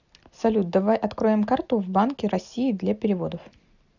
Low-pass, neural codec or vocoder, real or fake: 7.2 kHz; none; real